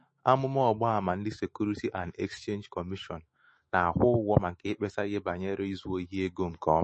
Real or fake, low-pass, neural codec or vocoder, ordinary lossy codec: fake; 9.9 kHz; autoencoder, 48 kHz, 128 numbers a frame, DAC-VAE, trained on Japanese speech; MP3, 32 kbps